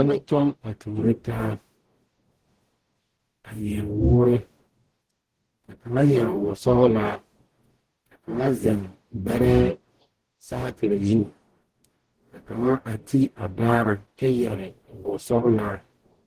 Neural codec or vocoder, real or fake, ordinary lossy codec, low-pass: codec, 44.1 kHz, 0.9 kbps, DAC; fake; Opus, 16 kbps; 14.4 kHz